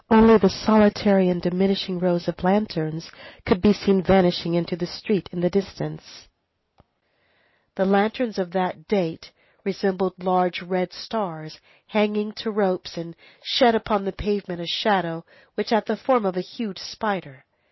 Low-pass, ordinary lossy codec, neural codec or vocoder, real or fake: 7.2 kHz; MP3, 24 kbps; none; real